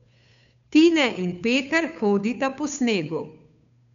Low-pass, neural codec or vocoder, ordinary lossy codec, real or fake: 7.2 kHz; codec, 16 kHz, 2 kbps, FunCodec, trained on Chinese and English, 25 frames a second; none; fake